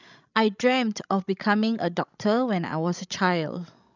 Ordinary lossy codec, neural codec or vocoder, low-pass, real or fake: none; codec, 16 kHz, 16 kbps, FreqCodec, larger model; 7.2 kHz; fake